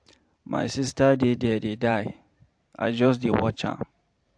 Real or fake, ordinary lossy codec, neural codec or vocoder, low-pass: fake; AAC, 64 kbps; vocoder, 44.1 kHz, 128 mel bands every 512 samples, BigVGAN v2; 9.9 kHz